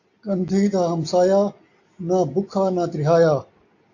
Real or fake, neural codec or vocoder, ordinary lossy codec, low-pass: real; none; AAC, 48 kbps; 7.2 kHz